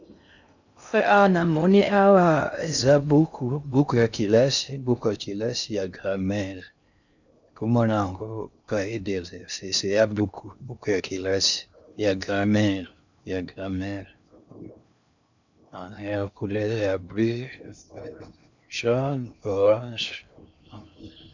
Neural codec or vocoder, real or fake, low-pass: codec, 16 kHz in and 24 kHz out, 0.6 kbps, FocalCodec, streaming, 2048 codes; fake; 7.2 kHz